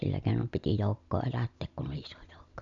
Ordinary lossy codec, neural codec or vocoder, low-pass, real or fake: none; none; 7.2 kHz; real